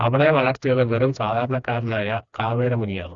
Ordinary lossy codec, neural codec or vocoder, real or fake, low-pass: Opus, 64 kbps; codec, 16 kHz, 2 kbps, FreqCodec, smaller model; fake; 7.2 kHz